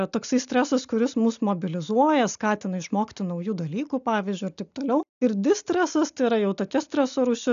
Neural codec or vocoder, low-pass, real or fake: none; 7.2 kHz; real